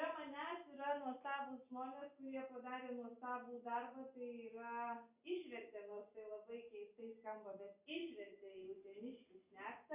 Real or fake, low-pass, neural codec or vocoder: real; 3.6 kHz; none